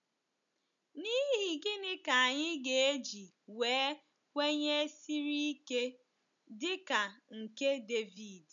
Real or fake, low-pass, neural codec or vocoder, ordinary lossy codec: real; 7.2 kHz; none; none